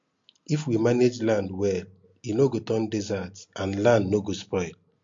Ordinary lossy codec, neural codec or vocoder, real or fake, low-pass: MP3, 48 kbps; none; real; 7.2 kHz